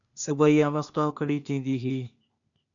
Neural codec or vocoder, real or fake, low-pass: codec, 16 kHz, 0.8 kbps, ZipCodec; fake; 7.2 kHz